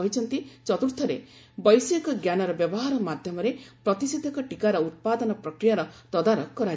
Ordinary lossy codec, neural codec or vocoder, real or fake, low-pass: none; none; real; none